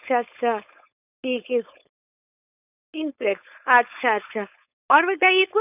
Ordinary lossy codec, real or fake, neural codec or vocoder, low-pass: none; fake; codec, 16 kHz, 4.8 kbps, FACodec; 3.6 kHz